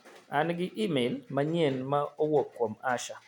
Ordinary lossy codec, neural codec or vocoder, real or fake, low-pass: none; none; real; 19.8 kHz